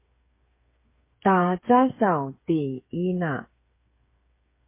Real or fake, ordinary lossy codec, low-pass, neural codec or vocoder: fake; MP3, 24 kbps; 3.6 kHz; codec, 16 kHz, 8 kbps, FreqCodec, smaller model